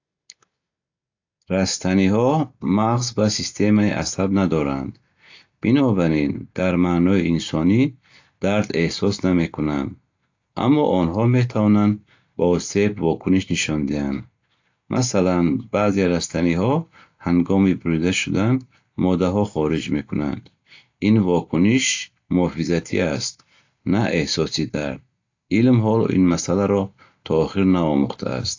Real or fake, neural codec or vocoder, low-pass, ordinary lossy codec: real; none; 7.2 kHz; AAC, 48 kbps